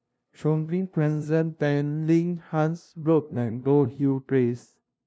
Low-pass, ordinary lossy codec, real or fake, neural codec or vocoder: none; none; fake; codec, 16 kHz, 0.5 kbps, FunCodec, trained on LibriTTS, 25 frames a second